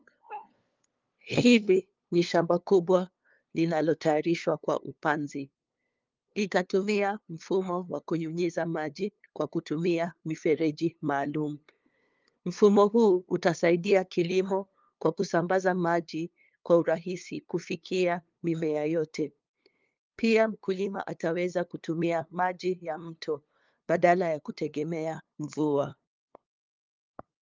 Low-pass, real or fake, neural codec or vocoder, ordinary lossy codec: 7.2 kHz; fake; codec, 16 kHz, 2 kbps, FunCodec, trained on LibriTTS, 25 frames a second; Opus, 24 kbps